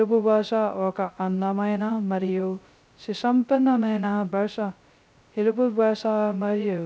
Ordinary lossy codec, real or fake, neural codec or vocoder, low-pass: none; fake; codec, 16 kHz, 0.2 kbps, FocalCodec; none